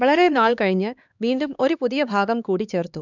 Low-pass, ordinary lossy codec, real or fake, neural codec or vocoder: 7.2 kHz; none; fake; codec, 16 kHz, 2 kbps, X-Codec, HuBERT features, trained on LibriSpeech